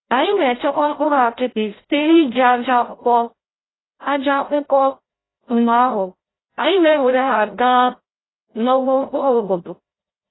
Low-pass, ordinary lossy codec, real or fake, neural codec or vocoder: 7.2 kHz; AAC, 16 kbps; fake; codec, 16 kHz, 0.5 kbps, FreqCodec, larger model